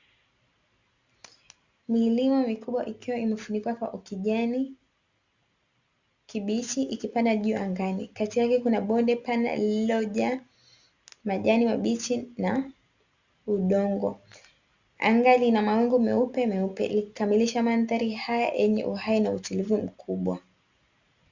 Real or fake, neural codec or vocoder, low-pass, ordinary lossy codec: real; none; 7.2 kHz; Opus, 64 kbps